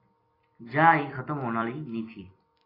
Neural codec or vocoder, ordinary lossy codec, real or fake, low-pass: vocoder, 44.1 kHz, 128 mel bands every 256 samples, BigVGAN v2; AAC, 24 kbps; fake; 5.4 kHz